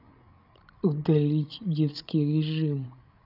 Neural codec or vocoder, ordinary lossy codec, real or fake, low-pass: codec, 16 kHz, 8 kbps, FreqCodec, larger model; none; fake; 5.4 kHz